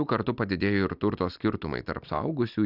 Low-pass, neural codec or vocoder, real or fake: 5.4 kHz; none; real